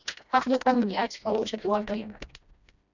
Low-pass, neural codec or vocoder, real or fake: 7.2 kHz; codec, 16 kHz, 1 kbps, FreqCodec, smaller model; fake